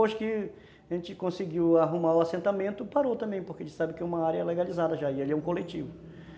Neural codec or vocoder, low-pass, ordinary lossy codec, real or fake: none; none; none; real